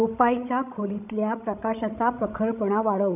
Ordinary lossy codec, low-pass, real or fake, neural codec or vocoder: none; 3.6 kHz; fake; codec, 16 kHz, 16 kbps, FreqCodec, larger model